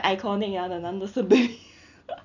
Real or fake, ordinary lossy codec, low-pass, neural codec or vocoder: real; none; 7.2 kHz; none